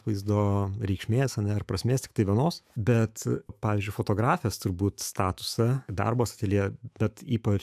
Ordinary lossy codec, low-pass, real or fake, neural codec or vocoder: AAC, 96 kbps; 14.4 kHz; fake; autoencoder, 48 kHz, 128 numbers a frame, DAC-VAE, trained on Japanese speech